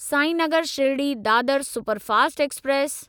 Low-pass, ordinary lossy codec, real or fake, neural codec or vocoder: none; none; real; none